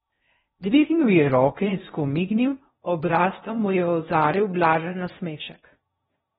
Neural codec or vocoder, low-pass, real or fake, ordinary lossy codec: codec, 16 kHz in and 24 kHz out, 0.6 kbps, FocalCodec, streaming, 2048 codes; 10.8 kHz; fake; AAC, 16 kbps